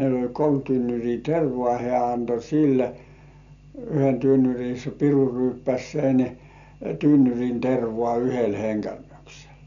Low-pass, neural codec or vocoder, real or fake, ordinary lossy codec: 7.2 kHz; none; real; none